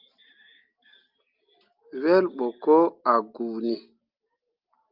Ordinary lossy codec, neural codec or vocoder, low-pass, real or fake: Opus, 32 kbps; none; 5.4 kHz; real